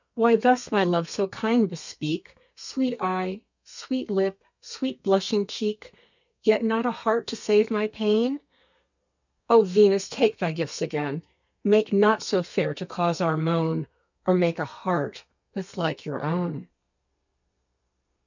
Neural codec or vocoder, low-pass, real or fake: codec, 32 kHz, 1.9 kbps, SNAC; 7.2 kHz; fake